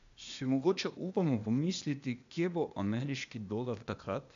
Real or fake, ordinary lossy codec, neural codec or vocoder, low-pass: fake; AAC, 96 kbps; codec, 16 kHz, 0.8 kbps, ZipCodec; 7.2 kHz